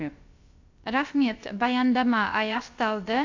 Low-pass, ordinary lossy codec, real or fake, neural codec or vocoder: 7.2 kHz; AAC, 48 kbps; fake; codec, 16 kHz, about 1 kbps, DyCAST, with the encoder's durations